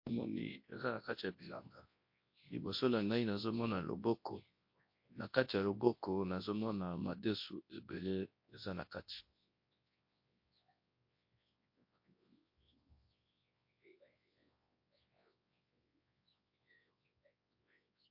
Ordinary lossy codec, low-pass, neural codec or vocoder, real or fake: MP3, 32 kbps; 5.4 kHz; codec, 24 kHz, 0.9 kbps, WavTokenizer, large speech release; fake